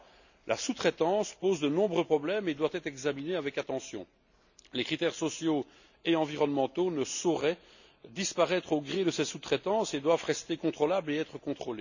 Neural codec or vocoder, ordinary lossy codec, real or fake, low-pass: none; none; real; 7.2 kHz